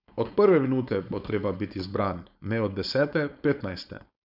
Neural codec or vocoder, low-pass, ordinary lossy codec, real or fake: codec, 16 kHz, 4.8 kbps, FACodec; 5.4 kHz; none; fake